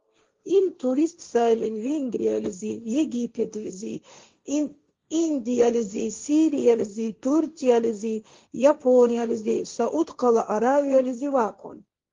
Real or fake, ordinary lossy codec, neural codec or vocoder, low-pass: fake; Opus, 32 kbps; codec, 16 kHz, 1.1 kbps, Voila-Tokenizer; 7.2 kHz